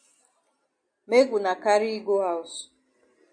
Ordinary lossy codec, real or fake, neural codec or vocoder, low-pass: MP3, 48 kbps; real; none; 9.9 kHz